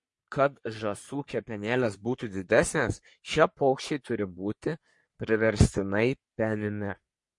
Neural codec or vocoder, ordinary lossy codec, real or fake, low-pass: codec, 44.1 kHz, 3.4 kbps, Pupu-Codec; MP3, 48 kbps; fake; 10.8 kHz